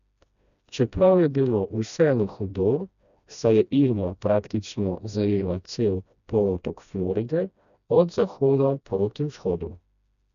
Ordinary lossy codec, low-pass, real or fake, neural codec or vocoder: none; 7.2 kHz; fake; codec, 16 kHz, 1 kbps, FreqCodec, smaller model